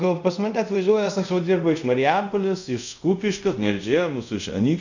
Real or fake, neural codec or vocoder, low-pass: fake; codec, 24 kHz, 0.5 kbps, DualCodec; 7.2 kHz